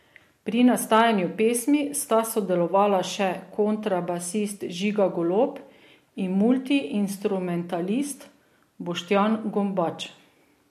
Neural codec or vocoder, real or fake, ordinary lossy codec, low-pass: none; real; MP3, 64 kbps; 14.4 kHz